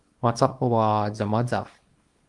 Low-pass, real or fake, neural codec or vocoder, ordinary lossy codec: 10.8 kHz; fake; codec, 24 kHz, 0.9 kbps, WavTokenizer, small release; Opus, 32 kbps